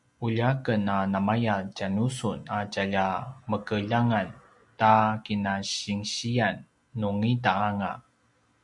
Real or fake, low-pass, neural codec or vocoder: real; 10.8 kHz; none